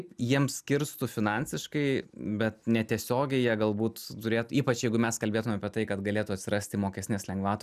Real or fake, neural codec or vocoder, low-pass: real; none; 14.4 kHz